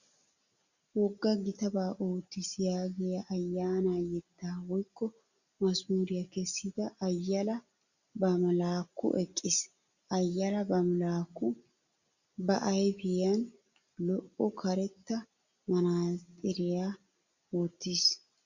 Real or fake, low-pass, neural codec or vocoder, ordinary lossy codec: real; 7.2 kHz; none; Opus, 64 kbps